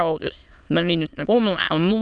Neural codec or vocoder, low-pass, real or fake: autoencoder, 22.05 kHz, a latent of 192 numbers a frame, VITS, trained on many speakers; 9.9 kHz; fake